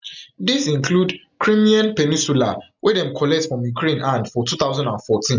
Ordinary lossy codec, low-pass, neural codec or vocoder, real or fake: none; 7.2 kHz; none; real